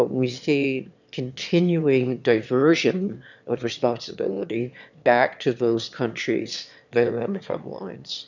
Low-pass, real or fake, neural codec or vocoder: 7.2 kHz; fake; autoencoder, 22.05 kHz, a latent of 192 numbers a frame, VITS, trained on one speaker